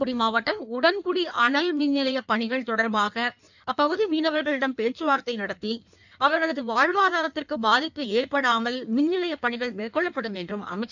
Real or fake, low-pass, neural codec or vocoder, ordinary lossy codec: fake; 7.2 kHz; codec, 16 kHz in and 24 kHz out, 1.1 kbps, FireRedTTS-2 codec; none